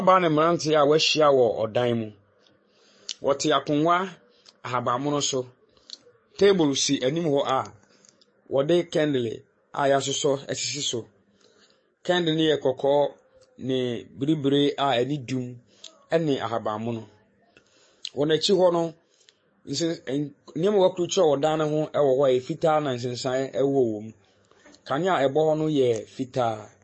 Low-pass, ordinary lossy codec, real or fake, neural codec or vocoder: 9.9 kHz; MP3, 32 kbps; fake; codec, 44.1 kHz, 7.8 kbps, DAC